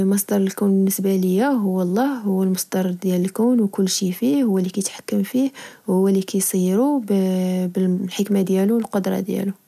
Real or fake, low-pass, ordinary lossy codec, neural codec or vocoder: real; 14.4 kHz; none; none